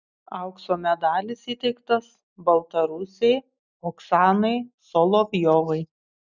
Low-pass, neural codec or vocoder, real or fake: 7.2 kHz; none; real